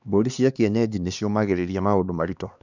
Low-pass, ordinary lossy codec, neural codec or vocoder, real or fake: 7.2 kHz; none; codec, 16 kHz, 2 kbps, X-Codec, HuBERT features, trained on LibriSpeech; fake